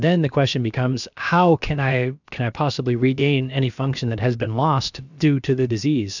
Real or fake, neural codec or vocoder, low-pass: fake; codec, 16 kHz, about 1 kbps, DyCAST, with the encoder's durations; 7.2 kHz